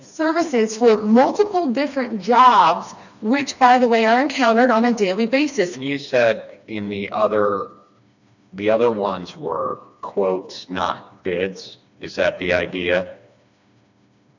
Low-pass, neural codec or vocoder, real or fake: 7.2 kHz; codec, 16 kHz, 2 kbps, FreqCodec, smaller model; fake